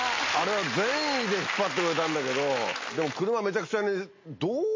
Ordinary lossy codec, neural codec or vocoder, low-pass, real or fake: MP3, 32 kbps; none; 7.2 kHz; real